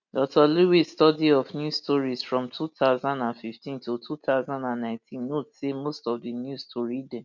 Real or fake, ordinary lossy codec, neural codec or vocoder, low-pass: real; none; none; 7.2 kHz